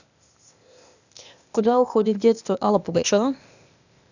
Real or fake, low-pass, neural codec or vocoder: fake; 7.2 kHz; codec, 16 kHz, 0.8 kbps, ZipCodec